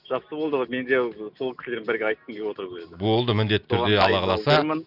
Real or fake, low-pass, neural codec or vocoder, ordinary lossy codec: real; 5.4 kHz; none; none